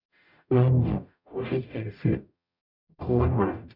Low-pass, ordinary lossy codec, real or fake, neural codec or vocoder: 5.4 kHz; none; fake; codec, 44.1 kHz, 0.9 kbps, DAC